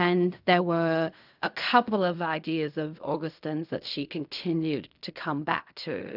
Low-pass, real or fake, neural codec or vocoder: 5.4 kHz; fake; codec, 16 kHz in and 24 kHz out, 0.4 kbps, LongCat-Audio-Codec, fine tuned four codebook decoder